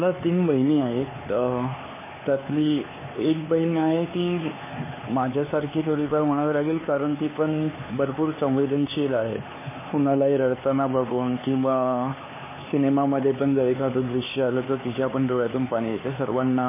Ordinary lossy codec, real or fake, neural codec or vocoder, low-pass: MP3, 16 kbps; fake; codec, 16 kHz, 4 kbps, X-Codec, HuBERT features, trained on LibriSpeech; 3.6 kHz